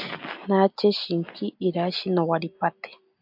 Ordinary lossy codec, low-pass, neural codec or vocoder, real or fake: AAC, 48 kbps; 5.4 kHz; none; real